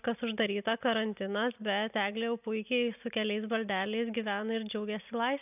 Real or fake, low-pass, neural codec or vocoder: real; 3.6 kHz; none